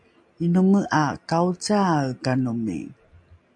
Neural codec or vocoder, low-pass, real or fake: none; 9.9 kHz; real